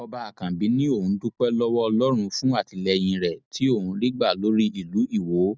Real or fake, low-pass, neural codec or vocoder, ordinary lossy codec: real; none; none; none